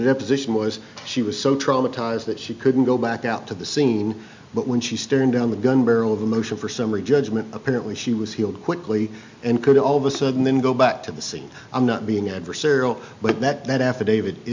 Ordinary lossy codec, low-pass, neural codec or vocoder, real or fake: MP3, 64 kbps; 7.2 kHz; none; real